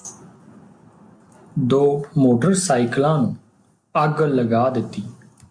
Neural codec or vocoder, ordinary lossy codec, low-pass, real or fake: none; MP3, 64 kbps; 9.9 kHz; real